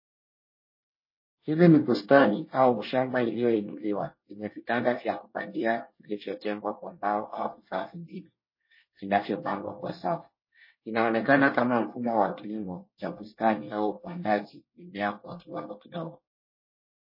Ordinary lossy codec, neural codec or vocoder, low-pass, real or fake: MP3, 24 kbps; codec, 24 kHz, 1 kbps, SNAC; 5.4 kHz; fake